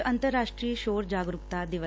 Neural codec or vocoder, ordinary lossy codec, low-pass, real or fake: none; none; none; real